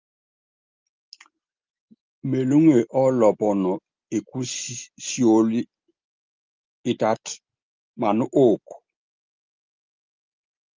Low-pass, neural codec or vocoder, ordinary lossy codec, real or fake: 7.2 kHz; none; Opus, 24 kbps; real